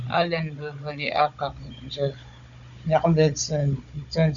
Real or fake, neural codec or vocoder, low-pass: fake; codec, 16 kHz, 16 kbps, FunCodec, trained on Chinese and English, 50 frames a second; 7.2 kHz